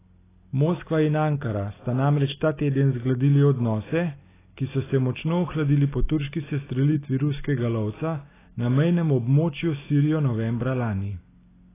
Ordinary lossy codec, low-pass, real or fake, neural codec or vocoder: AAC, 16 kbps; 3.6 kHz; real; none